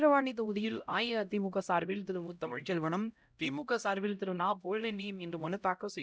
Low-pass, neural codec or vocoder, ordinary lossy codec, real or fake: none; codec, 16 kHz, 0.5 kbps, X-Codec, HuBERT features, trained on LibriSpeech; none; fake